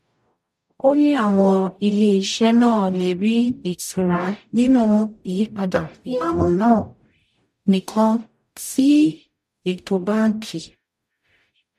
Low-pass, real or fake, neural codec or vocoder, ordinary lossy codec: 14.4 kHz; fake; codec, 44.1 kHz, 0.9 kbps, DAC; MP3, 64 kbps